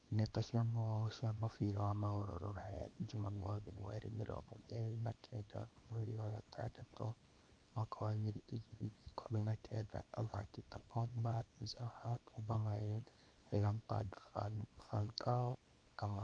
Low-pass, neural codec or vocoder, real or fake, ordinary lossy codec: 9.9 kHz; codec, 24 kHz, 0.9 kbps, WavTokenizer, small release; fake; MP3, 64 kbps